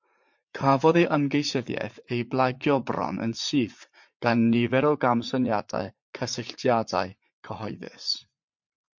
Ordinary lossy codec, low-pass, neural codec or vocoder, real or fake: MP3, 48 kbps; 7.2 kHz; vocoder, 44.1 kHz, 80 mel bands, Vocos; fake